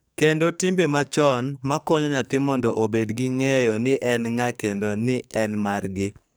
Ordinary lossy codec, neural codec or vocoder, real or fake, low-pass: none; codec, 44.1 kHz, 2.6 kbps, SNAC; fake; none